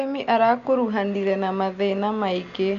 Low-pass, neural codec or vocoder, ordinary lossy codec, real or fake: 7.2 kHz; none; none; real